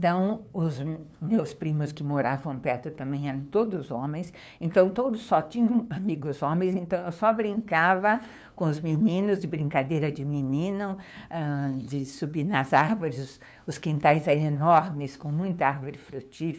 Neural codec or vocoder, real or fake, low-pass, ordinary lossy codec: codec, 16 kHz, 2 kbps, FunCodec, trained on LibriTTS, 25 frames a second; fake; none; none